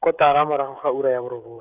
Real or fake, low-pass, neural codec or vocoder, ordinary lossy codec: fake; 3.6 kHz; codec, 16 kHz, 16 kbps, FreqCodec, smaller model; none